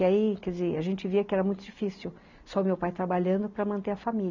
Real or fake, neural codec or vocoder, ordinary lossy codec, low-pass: real; none; none; 7.2 kHz